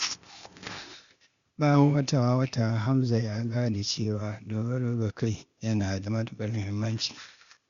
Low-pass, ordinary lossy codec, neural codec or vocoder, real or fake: 7.2 kHz; Opus, 64 kbps; codec, 16 kHz, 0.8 kbps, ZipCodec; fake